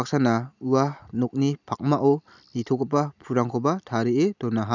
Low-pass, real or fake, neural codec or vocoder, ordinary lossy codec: 7.2 kHz; real; none; none